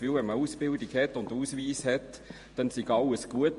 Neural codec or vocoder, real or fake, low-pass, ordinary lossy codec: vocoder, 48 kHz, 128 mel bands, Vocos; fake; 14.4 kHz; MP3, 48 kbps